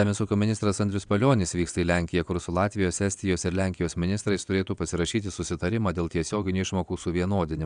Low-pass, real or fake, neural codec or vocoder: 9.9 kHz; fake; vocoder, 22.05 kHz, 80 mel bands, WaveNeXt